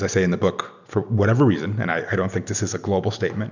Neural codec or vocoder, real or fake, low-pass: none; real; 7.2 kHz